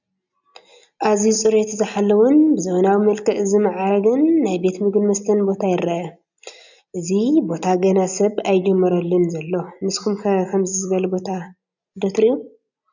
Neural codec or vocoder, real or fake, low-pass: none; real; 7.2 kHz